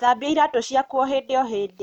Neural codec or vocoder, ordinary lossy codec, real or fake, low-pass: none; none; real; 19.8 kHz